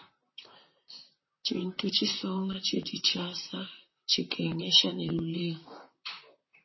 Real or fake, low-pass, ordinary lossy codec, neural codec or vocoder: fake; 7.2 kHz; MP3, 24 kbps; vocoder, 44.1 kHz, 128 mel bands, Pupu-Vocoder